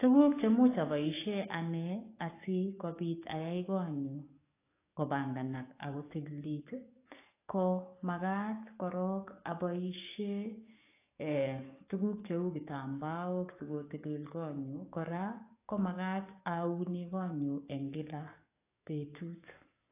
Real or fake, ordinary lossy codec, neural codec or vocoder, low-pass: fake; AAC, 24 kbps; codec, 44.1 kHz, 7.8 kbps, DAC; 3.6 kHz